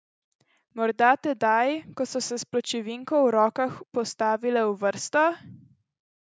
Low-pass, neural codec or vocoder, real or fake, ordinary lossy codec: none; none; real; none